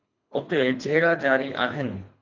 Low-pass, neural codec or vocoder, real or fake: 7.2 kHz; codec, 24 kHz, 3 kbps, HILCodec; fake